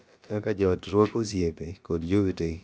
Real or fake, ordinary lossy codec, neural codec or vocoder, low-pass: fake; none; codec, 16 kHz, about 1 kbps, DyCAST, with the encoder's durations; none